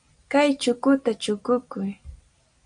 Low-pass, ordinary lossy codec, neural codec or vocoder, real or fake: 9.9 kHz; AAC, 64 kbps; none; real